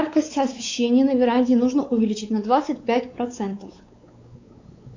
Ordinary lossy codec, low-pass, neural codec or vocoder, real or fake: AAC, 48 kbps; 7.2 kHz; codec, 16 kHz, 4 kbps, X-Codec, WavLM features, trained on Multilingual LibriSpeech; fake